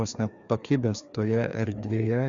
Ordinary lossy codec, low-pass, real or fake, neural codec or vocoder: Opus, 64 kbps; 7.2 kHz; fake; codec, 16 kHz, 2 kbps, FreqCodec, larger model